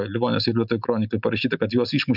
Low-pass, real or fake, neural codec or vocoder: 5.4 kHz; real; none